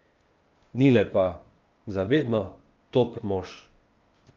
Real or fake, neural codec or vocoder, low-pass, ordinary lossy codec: fake; codec, 16 kHz, 0.8 kbps, ZipCodec; 7.2 kHz; Opus, 32 kbps